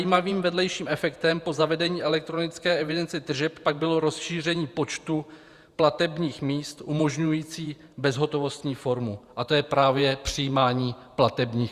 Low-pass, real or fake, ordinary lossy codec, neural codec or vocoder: 14.4 kHz; fake; Opus, 64 kbps; vocoder, 48 kHz, 128 mel bands, Vocos